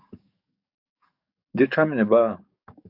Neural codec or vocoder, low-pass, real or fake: codec, 44.1 kHz, 7.8 kbps, DAC; 5.4 kHz; fake